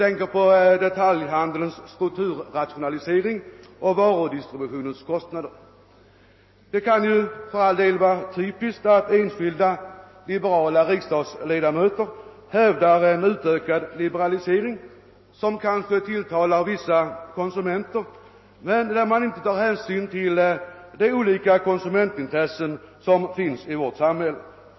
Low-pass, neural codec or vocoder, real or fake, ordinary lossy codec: 7.2 kHz; none; real; MP3, 24 kbps